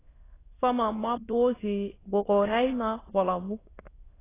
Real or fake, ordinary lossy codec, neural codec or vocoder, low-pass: fake; AAC, 16 kbps; autoencoder, 22.05 kHz, a latent of 192 numbers a frame, VITS, trained on many speakers; 3.6 kHz